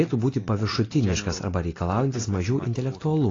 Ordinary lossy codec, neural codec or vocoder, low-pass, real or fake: AAC, 32 kbps; none; 7.2 kHz; real